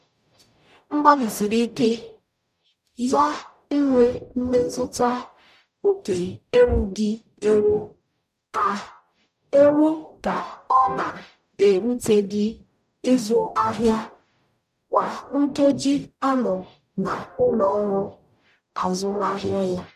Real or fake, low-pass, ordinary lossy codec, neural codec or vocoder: fake; 14.4 kHz; none; codec, 44.1 kHz, 0.9 kbps, DAC